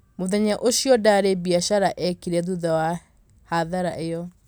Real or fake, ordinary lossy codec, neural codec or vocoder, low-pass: real; none; none; none